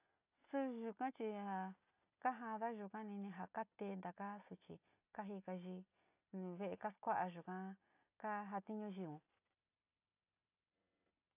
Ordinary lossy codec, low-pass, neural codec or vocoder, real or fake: AAC, 32 kbps; 3.6 kHz; none; real